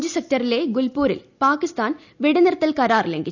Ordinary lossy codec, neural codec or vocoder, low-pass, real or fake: none; none; 7.2 kHz; real